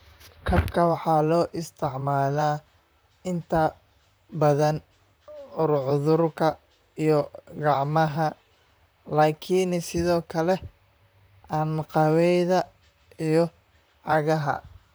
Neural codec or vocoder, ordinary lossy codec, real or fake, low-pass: vocoder, 44.1 kHz, 128 mel bands, Pupu-Vocoder; none; fake; none